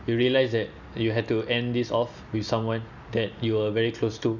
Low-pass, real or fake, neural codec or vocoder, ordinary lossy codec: 7.2 kHz; real; none; none